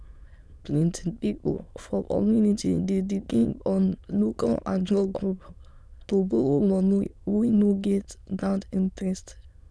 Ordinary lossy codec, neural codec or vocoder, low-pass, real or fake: none; autoencoder, 22.05 kHz, a latent of 192 numbers a frame, VITS, trained on many speakers; none; fake